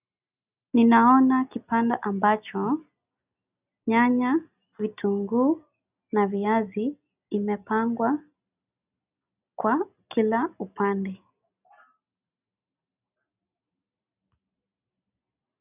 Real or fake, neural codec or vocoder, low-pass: real; none; 3.6 kHz